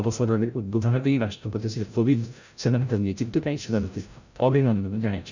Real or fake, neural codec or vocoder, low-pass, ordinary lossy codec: fake; codec, 16 kHz, 0.5 kbps, FreqCodec, larger model; 7.2 kHz; none